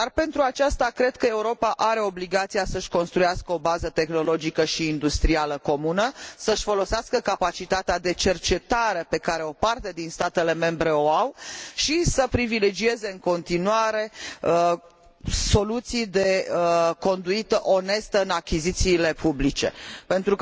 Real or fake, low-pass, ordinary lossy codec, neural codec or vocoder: real; none; none; none